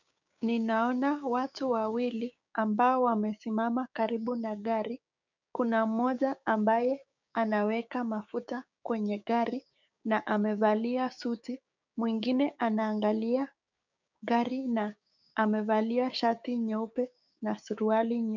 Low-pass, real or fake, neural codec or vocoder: 7.2 kHz; fake; codec, 16 kHz, 6 kbps, DAC